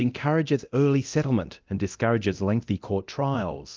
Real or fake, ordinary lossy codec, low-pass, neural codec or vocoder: fake; Opus, 24 kbps; 7.2 kHz; codec, 24 kHz, 0.9 kbps, DualCodec